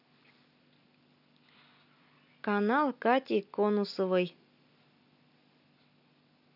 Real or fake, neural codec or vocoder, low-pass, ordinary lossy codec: real; none; 5.4 kHz; none